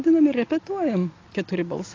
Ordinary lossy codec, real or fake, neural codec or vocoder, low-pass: AAC, 32 kbps; real; none; 7.2 kHz